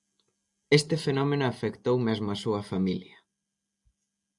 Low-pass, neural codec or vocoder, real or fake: 10.8 kHz; none; real